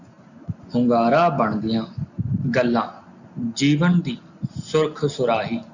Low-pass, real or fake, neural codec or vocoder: 7.2 kHz; real; none